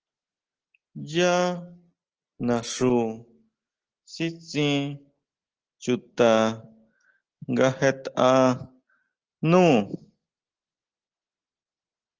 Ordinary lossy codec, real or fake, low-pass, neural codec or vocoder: Opus, 16 kbps; real; 7.2 kHz; none